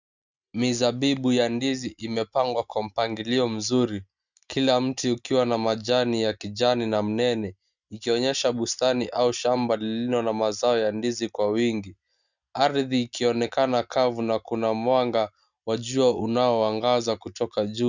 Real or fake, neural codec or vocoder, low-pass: real; none; 7.2 kHz